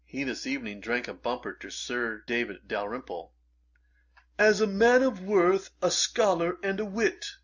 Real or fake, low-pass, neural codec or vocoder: real; 7.2 kHz; none